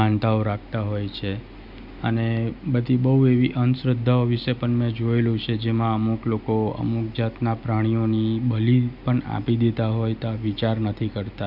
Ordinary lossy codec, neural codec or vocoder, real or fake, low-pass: none; none; real; 5.4 kHz